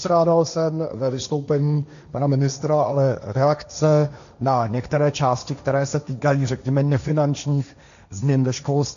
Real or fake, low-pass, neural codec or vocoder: fake; 7.2 kHz; codec, 16 kHz, 1.1 kbps, Voila-Tokenizer